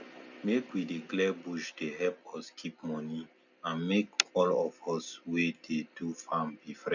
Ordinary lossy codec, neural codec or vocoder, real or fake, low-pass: none; none; real; 7.2 kHz